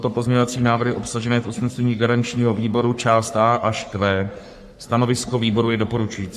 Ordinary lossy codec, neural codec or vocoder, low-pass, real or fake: AAC, 96 kbps; codec, 44.1 kHz, 3.4 kbps, Pupu-Codec; 14.4 kHz; fake